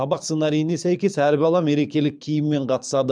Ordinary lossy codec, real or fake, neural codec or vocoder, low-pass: none; fake; codec, 24 kHz, 6 kbps, HILCodec; 9.9 kHz